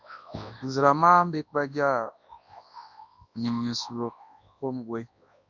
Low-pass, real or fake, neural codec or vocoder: 7.2 kHz; fake; codec, 24 kHz, 0.9 kbps, WavTokenizer, large speech release